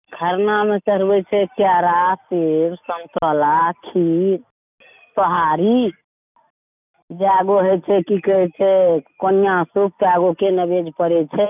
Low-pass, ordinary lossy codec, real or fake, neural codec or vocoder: 3.6 kHz; none; real; none